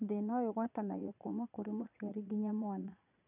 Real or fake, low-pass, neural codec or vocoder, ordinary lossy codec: fake; 3.6 kHz; vocoder, 22.05 kHz, 80 mel bands, Vocos; none